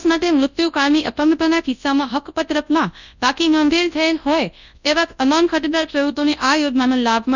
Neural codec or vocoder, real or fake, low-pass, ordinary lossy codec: codec, 24 kHz, 0.9 kbps, WavTokenizer, large speech release; fake; 7.2 kHz; none